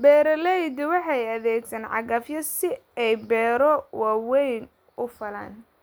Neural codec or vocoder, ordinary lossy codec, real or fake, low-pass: none; none; real; none